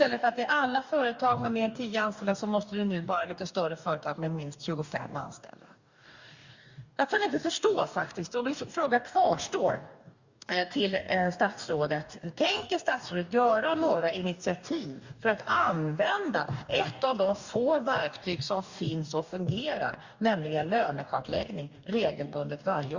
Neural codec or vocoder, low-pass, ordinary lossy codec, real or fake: codec, 44.1 kHz, 2.6 kbps, DAC; 7.2 kHz; Opus, 64 kbps; fake